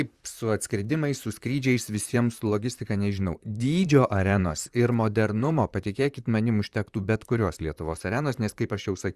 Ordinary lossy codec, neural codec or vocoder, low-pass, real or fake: Opus, 64 kbps; vocoder, 44.1 kHz, 128 mel bands, Pupu-Vocoder; 14.4 kHz; fake